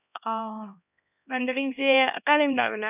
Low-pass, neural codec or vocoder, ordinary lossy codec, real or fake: 3.6 kHz; codec, 16 kHz, 1 kbps, X-Codec, HuBERT features, trained on LibriSpeech; none; fake